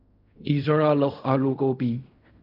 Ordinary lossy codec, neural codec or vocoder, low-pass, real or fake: none; codec, 16 kHz in and 24 kHz out, 0.4 kbps, LongCat-Audio-Codec, fine tuned four codebook decoder; 5.4 kHz; fake